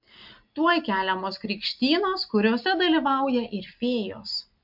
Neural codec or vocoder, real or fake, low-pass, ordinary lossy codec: none; real; 5.4 kHz; MP3, 48 kbps